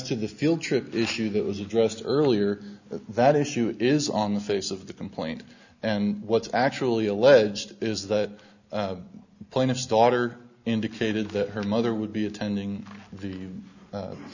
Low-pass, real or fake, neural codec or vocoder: 7.2 kHz; real; none